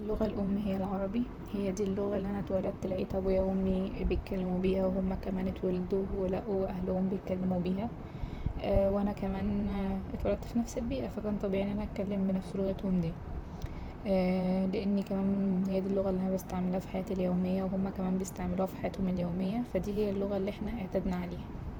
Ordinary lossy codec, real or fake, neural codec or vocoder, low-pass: none; fake; vocoder, 44.1 kHz, 128 mel bands, Pupu-Vocoder; none